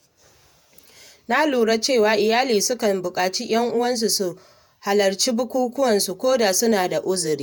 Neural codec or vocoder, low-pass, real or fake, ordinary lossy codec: vocoder, 48 kHz, 128 mel bands, Vocos; none; fake; none